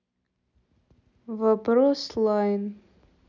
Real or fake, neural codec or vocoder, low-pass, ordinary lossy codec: real; none; 7.2 kHz; none